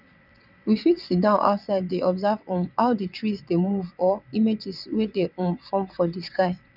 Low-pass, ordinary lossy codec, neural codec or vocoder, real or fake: 5.4 kHz; none; vocoder, 22.05 kHz, 80 mel bands, WaveNeXt; fake